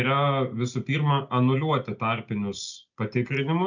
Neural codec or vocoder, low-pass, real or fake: none; 7.2 kHz; real